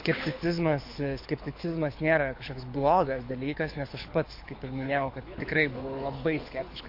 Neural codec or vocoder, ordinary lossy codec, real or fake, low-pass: codec, 24 kHz, 6 kbps, HILCodec; MP3, 32 kbps; fake; 5.4 kHz